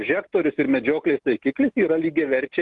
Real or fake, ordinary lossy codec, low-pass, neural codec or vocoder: real; Opus, 24 kbps; 10.8 kHz; none